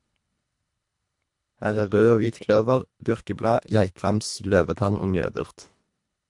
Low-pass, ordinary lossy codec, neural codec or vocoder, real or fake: 10.8 kHz; MP3, 64 kbps; codec, 24 kHz, 1.5 kbps, HILCodec; fake